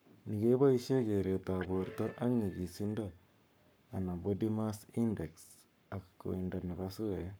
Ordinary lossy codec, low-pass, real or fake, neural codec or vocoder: none; none; fake; codec, 44.1 kHz, 7.8 kbps, Pupu-Codec